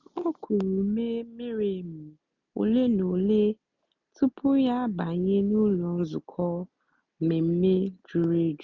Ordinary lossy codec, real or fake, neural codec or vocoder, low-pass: none; real; none; 7.2 kHz